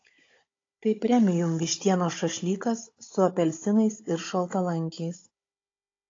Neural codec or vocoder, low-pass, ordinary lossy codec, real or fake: codec, 16 kHz, 16 kbps, FunCodec, trained on Chinese and English, 50 frames a second; 7.2 kHz; AAC, 32 kbps; fake